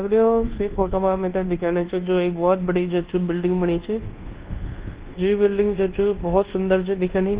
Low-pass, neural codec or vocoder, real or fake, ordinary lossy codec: 3.6 kHz; codec, 24 kHz, 1.2 kbps, DualCodec; fake; Opus, 16 kbps